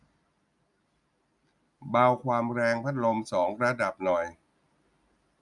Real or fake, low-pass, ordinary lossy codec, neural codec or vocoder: real; 10.8 kHz; none; none